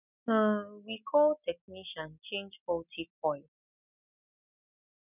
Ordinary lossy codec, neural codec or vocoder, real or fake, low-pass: none; none; real; 3.6 kHz